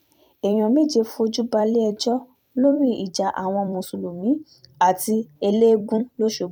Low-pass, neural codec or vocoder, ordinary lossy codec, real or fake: 19.8 kHz; vocoder, 44.1 kHz, 128 mel bands every 256 samples, BigVGAN v2; none; fake